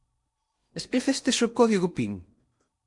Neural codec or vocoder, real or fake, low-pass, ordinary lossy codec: codec, 16 kHz in and 24 kHz out, 0.8 kbps, FocalCodec, streaming, 65536 codes; fake; 10.8 kHz; MP3, 64 kbps